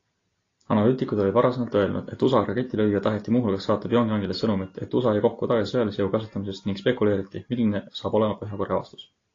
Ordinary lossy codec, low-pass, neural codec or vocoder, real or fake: AAC, 32 kbps; 7.2 kHz; none; real